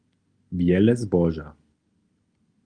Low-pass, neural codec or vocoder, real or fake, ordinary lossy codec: 9.9 kHz; none; real; Opus, 32 kbps